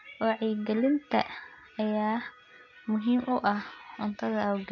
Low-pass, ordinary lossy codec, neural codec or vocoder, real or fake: 7.2 kHz; none; none; real